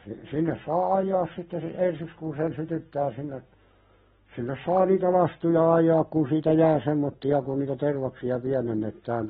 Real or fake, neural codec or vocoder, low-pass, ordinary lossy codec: real; none; 7.2 kHz; AAC, 16 kbps